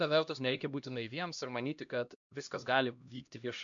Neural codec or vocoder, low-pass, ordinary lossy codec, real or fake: codec, 16 kHz, 1 kbps, X-Codec, HuBERT features, trained on LibriSpeech; 7.2 kHz; MP3, 64 kbps; fake